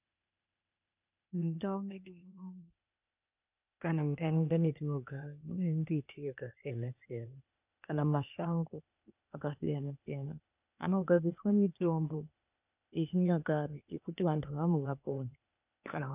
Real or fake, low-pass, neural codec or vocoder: fake; 3.6 kHz; codec, 16 kHz, 0.8 kbps, ZipCodec